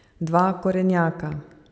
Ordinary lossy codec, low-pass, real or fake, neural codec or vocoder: none; none; real; none